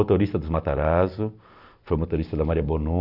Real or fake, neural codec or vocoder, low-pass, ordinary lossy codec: real; none; 5.4 kHz; none